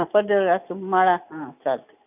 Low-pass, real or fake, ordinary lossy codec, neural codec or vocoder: 3.6 kHz; real; none; none